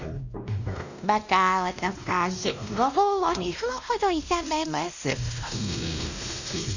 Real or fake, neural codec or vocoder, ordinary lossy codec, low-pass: fake; codec, 16 kHz, 1 kbps, X-Codec, WavLM features, trained on Multilingual LibriSpeech; none; 7.2 kHz